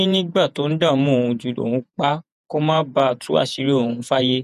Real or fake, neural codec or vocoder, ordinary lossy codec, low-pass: fake; vocoder, 48 kHz, 128 mel bands, Vocos; none; 14.4 kHz